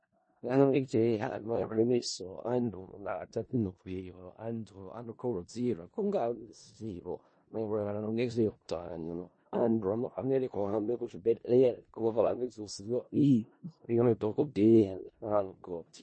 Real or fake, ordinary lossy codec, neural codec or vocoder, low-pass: fake; MP3, 32 kbps; codec, 16 kHz in and 24 kHz out, 0.4 kbps, LongCat-Audio-Codec, four codebook decoder; 9.9 kHz